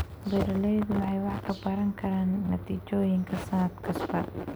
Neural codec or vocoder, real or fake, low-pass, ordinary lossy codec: none; real; none; none